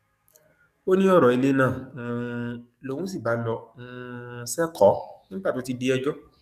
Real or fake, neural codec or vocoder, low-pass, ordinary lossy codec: fake; codec, 44.1 kHz, 7.8 kbps, DAC; 14.4 kHz; none